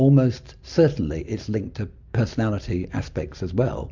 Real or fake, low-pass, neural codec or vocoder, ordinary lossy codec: real; 7.2 kHz; none; MP3, 64 kbps